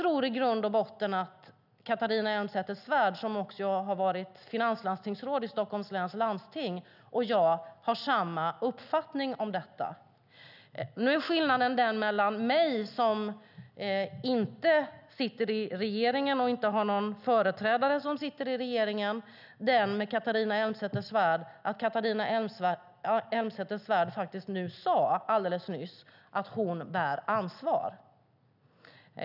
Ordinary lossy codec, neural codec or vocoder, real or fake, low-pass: none; none; real; 5.4 kHz